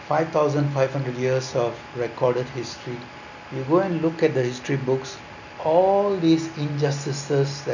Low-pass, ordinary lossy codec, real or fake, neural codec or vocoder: 7.2 kHz; none; real; none